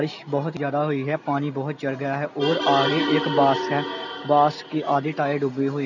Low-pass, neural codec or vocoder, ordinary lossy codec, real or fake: 7.2 kHz; none; none; real